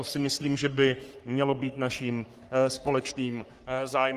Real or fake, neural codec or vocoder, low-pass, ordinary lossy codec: fake; codec, 44.1 kHz, 3.4 kbps, Pupu-Codec; 14.4 kHz; Opus, 24 kbps